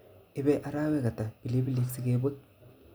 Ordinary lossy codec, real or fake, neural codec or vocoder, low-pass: none; real; none; none